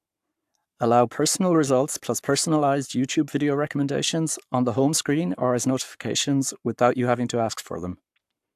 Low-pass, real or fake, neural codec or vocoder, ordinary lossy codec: 14.4 kHz; fake; codec, 44.1 kHz, 7.8 kbps, Pupu-Codec; AAC, 96 kbps